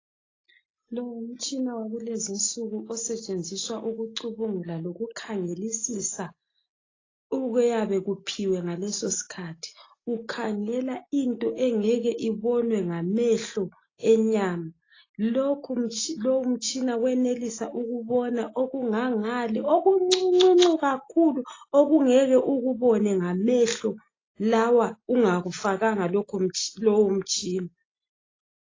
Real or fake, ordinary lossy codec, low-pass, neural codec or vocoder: real; AAC, 32 kbps; 7.2 kHz; none